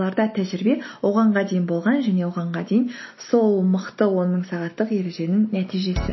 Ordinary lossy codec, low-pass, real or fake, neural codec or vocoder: MP3, 24 kbps; 7.2 kHz; real; none